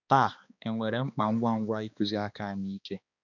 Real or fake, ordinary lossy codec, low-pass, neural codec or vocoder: fake; none; 7.2 kHz; codec, 16 kHz, 2 kbps, X-Codec, HuBERT features, trained on balanced general audio